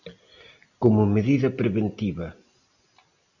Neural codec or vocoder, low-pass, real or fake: none; 7.2 kHz; real